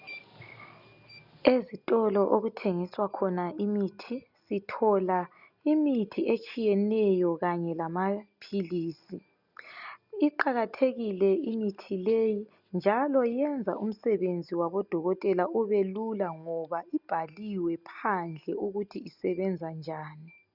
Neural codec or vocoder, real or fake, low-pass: none; real; 5.4 kHz